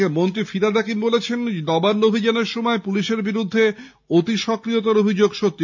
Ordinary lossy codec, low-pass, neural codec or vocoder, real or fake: none; 7.2 kHz; none; real